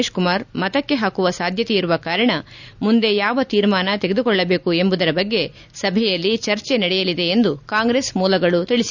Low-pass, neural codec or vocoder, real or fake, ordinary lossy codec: 7.2 kHz; none; real; none